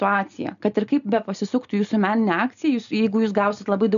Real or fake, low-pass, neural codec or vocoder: real; 7.2 kHz; none